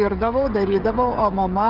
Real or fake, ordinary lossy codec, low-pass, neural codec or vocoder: fake; Opus, 24 kbps; 5.4 kHz; codec, 16 kHz, 16 kbps, FreqCodec, smaller model